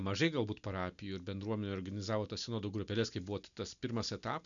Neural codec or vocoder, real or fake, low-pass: none; real; 7.2 kHz